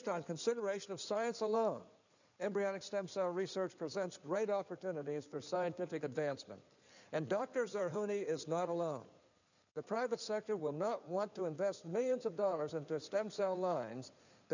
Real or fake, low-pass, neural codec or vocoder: fake; 7.2 kHz; codec, 16 kHz in and 24 kHz out, 2.2 kbps, FireRedTTS-2 codec